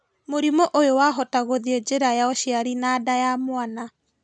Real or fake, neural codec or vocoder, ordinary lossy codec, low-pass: real; none; none; none